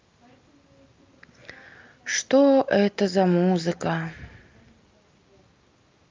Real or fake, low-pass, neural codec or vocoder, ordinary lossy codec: real; 7.2 kHz; none; Opus, 24 kbps